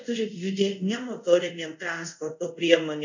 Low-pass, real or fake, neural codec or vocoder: 7.2 kHz; fake; codec, 24 kHz, 0.5 kbps, DualCodec